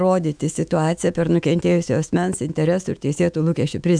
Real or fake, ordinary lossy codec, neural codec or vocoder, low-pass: fake; MP3, 96 kbps; autoencoder, 48 kHz, 128 numbers a frame, DAC-VAE, trained on Japanese speech; 9.9 kHz